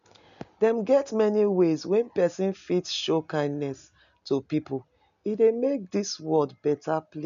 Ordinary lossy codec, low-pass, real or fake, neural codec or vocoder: none; 7.2 kHz; real; none